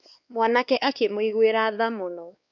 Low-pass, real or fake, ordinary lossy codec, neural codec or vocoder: 7.2 kHz; fake; none; codec, 16 kHz, 2 kbps, X-Codec, WavLM features, trained on Multilingual LibriSpeech